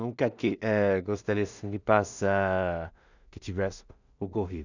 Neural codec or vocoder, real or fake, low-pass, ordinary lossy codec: codec, 16 kHz in and 24 kHz out, 0.4 kbps, LongCat-Audio-Codec, two codebook decoder; fake; 7.2 kHz; none